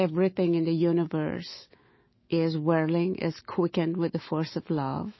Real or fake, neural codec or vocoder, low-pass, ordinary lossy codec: fake; autoencoder, 48 kHz, 128 numbers a frame, DAC-VAE, trained on Japanese speech; 7.2 kHz; MP3, 24 kbps